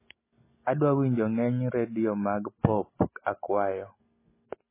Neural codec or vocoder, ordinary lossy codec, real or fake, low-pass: none; MP3, 16 kbps; real; 3.6 kHz